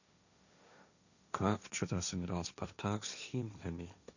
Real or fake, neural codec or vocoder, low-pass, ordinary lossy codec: fake; codec, 16 kHz, 1.1 kbps, Voila-Tokenizer; 7.2 kHz; none